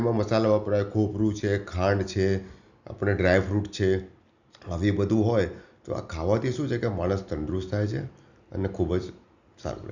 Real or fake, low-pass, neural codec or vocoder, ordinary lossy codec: real; 7.2 kHz; none; none